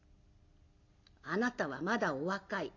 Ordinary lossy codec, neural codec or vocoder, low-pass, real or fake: none; none; 7.2 kHz; real